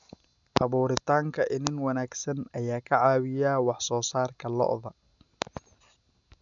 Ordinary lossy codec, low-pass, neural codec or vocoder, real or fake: none; 7.2 kHz; none; real